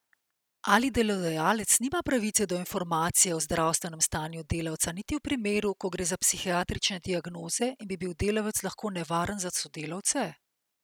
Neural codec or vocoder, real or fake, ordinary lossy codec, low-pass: none; real; none; none